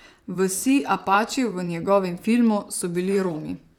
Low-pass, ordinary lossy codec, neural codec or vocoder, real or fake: 19.8 kHz; none; vocoder, 44.1 kHz, 128 mel bands, Pupu-Vocoder; fake